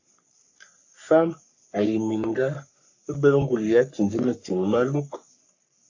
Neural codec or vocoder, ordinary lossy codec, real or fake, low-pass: codec, 44.1 kHz, 3.4 kbps, Pupu-Codec; AAC, 48 kbps; fake; 7.2 kHz